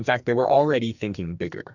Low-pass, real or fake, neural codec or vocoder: 7.2 kHz; fake; codec, 44.1 kHz, 2.6 kbps, SNAC